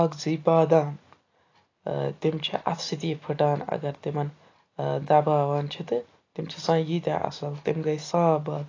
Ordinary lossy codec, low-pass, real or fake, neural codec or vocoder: AAC, 32 kbps; 7.2 kHz; real; none